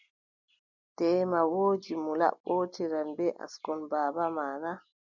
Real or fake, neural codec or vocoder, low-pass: real; none; 7.2 kHz